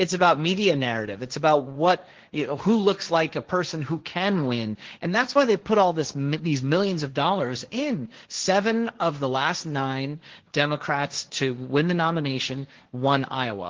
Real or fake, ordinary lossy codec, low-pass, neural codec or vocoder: fake; Opus, 16 kbps; 7.2 kHz; codec, 16 kHz, 1.1 kbps, Voila-Tokenizer